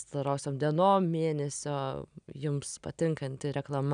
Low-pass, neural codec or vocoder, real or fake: 9.9 kHz; none; real